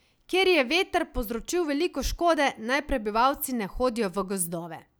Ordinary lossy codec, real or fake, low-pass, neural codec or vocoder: none; real; none; none